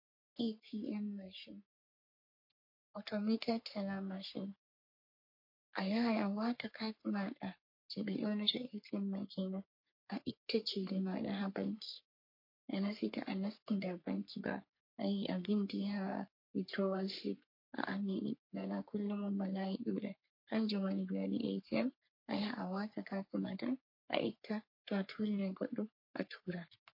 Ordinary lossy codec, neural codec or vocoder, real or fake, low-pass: MP3, 32 kbps; codec, 44.1 kHz, 3.4 kbps, Pupu-Codec; fake; 5.4 kHz